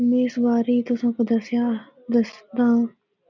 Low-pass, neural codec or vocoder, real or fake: 7.2 kHz; none; real